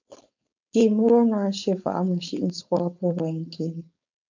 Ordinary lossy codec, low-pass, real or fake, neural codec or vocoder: MP3, 64 kbps; 7.2 kHz; fake; codec, 16 kHz, 4.8 kbps, FACodec